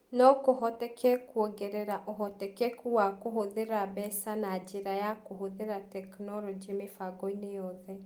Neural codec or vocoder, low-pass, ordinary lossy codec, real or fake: none; 19.8 kHz; Opus, 24 kbps; real